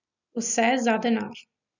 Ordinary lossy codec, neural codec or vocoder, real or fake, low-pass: none; none; real; 7.2 kHz